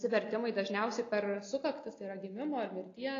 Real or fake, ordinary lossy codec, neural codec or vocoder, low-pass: real; AAC, 32 kbps; none; 7.2 kHz